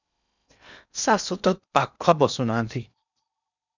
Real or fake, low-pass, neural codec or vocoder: fake; 7.2 kHz; codec, 16 kHz in and 24 kHz out, 0.8 kbps, FocalCodec, streaming, 65536 codes